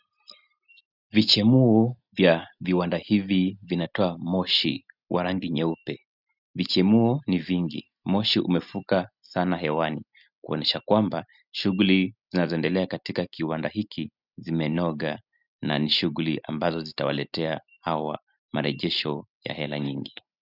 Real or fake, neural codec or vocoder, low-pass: real; none; 5.4 kHz